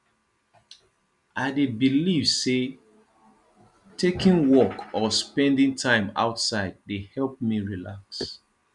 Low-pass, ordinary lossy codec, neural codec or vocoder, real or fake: 10.8 kHz; none; none; real